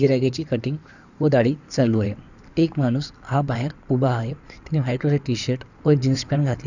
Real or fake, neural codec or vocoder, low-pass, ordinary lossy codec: fake; codec, 16 kHz in and 24 kHz out, 2.2 kbps, FireRedTTS-2 codec; 7.2 kHz; none